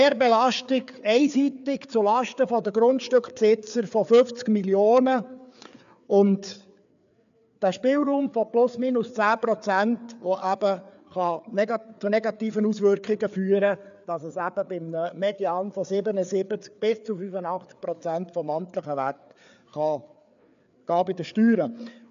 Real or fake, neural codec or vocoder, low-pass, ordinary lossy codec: fake; codec, 16 kHz, 4 kbps, FreqCodec, larger model; 7.2 kHz; MP3, 96 kbps